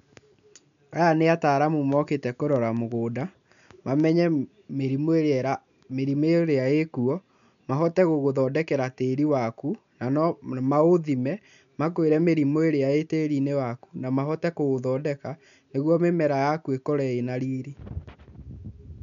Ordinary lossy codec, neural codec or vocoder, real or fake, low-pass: none; none; real; 7.2 kHz